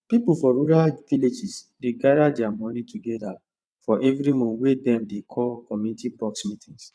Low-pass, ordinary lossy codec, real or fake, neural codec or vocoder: none; none; fake; vocoder, 22.05 kHz, 80 mel bands, WaveNeXt